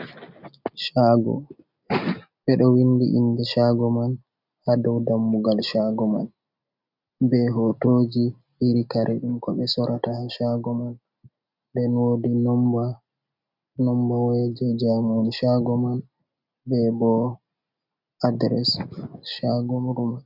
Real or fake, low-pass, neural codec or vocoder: real; 5.4 kHz; none